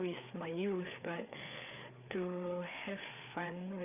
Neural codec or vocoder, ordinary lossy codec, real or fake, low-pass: codec, 16 kHz, 4 kbps, FreqCodec, larger model; none; fake; 3.6 kHz